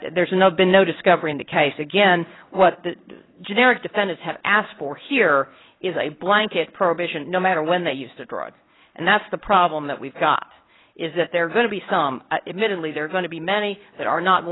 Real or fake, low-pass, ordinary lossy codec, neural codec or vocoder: fake; 7.2 kHz; AAC, 16 kbps; codec, 16 kHz in and 24 kHz out, 1 kbps, XY-Tokenizer